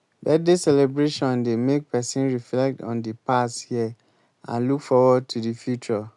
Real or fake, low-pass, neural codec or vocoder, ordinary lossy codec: real; 10.8 kHz; none; none